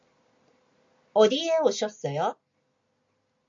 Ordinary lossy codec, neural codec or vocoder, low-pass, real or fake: MP3, 48 kbps; none; 7.2 kHz; real